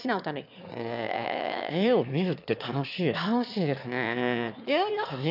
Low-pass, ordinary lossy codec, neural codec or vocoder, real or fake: 5.4 kHz; none; autoencoder, 22.05 kHz, a latent of 192 numbers a frame, VITS, trained on one speaker; fake